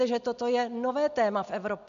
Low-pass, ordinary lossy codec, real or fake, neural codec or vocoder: 7.2 kHz; AAC, 96 kbps; real; none